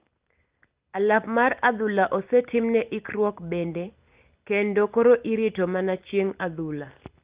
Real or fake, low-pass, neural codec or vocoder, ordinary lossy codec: real; 3.6 kHz; none; Opus, 32 kbps